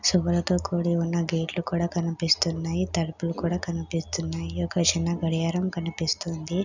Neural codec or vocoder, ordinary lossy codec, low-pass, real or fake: none; none; 7.2 kHz; real